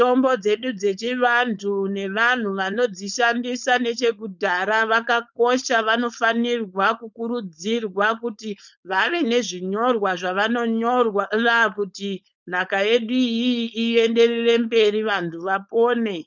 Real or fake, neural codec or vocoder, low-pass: fake; codec, 16 kHz, 4.8 kbps, FACodec; 7.2 kHz